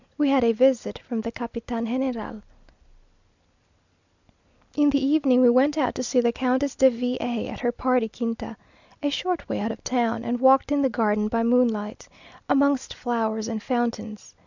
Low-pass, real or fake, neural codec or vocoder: 7.2 kHz; real; none